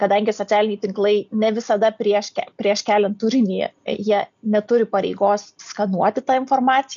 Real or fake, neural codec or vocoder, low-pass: real; none; 7.2 kHz